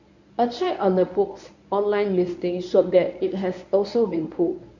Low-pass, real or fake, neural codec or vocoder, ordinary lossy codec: 7.2 kHz; fake; codec, 24 kHz, 0.9 kbps, WavTokenizer, medium speech release version 1; none